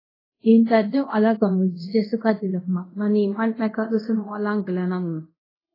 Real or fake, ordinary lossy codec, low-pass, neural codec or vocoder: fake; AAC, 24 kbps; 5.4 kHz; codec, 24 kHz, 0.5 kbps, DualCodec